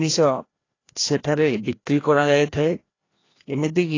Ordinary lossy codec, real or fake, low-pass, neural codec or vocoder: AAC, 32 kbps; fake; 7.2 kHz; codec, 16 kHz, 1 kbps, FreqCodec, larger model